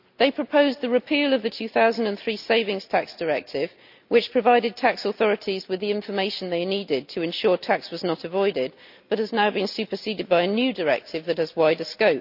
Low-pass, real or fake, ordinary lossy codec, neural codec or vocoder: 5.4 kHz; real; none; none